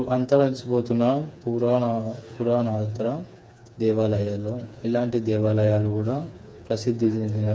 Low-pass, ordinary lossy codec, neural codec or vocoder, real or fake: none; none; codec, 16 kHz, 4 kbps, FreqCodec, smaller model; fake